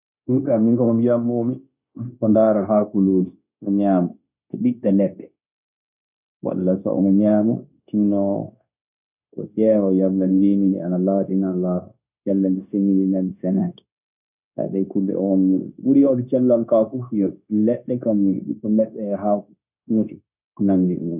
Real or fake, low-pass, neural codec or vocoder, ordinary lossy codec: fake; 3.6 kHz; codec, 16 kHz, 0.9 kbps, LongCat-Audio-Codec; none